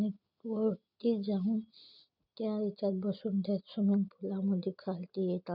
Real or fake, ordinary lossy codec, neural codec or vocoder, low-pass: real; none; none; 5.4 kHz